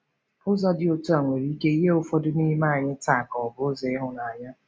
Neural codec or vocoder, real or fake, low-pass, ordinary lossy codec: none; real; none; none